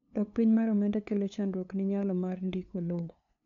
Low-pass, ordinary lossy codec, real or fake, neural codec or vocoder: 7.2 kHz; none; fake; codec, 16 kHz, 2 kbps, FunCodec, trained on LibriTTS, 25 frames a second